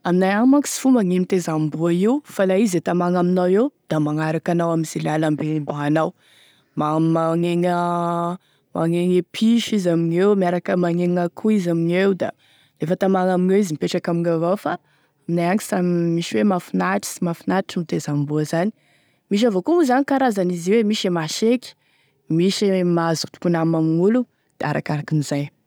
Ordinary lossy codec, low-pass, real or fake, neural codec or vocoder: none; none; real; none